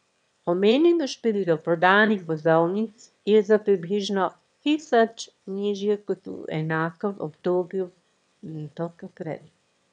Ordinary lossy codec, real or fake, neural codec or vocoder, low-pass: none; fake; autoencoder, 22.05 kHz, a latent of 192 numbers a frame, VITS, trained on one speaker; 9.9 kHz